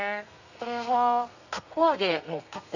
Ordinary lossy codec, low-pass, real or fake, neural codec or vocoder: none; 7.2 kHz; fake; codec, 32 kHz, 1.9 kbps, SNAC